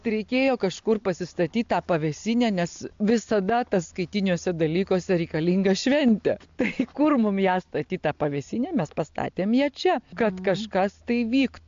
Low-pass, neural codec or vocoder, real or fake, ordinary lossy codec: 7.2 kHz; none; real; AAC, 64 kbps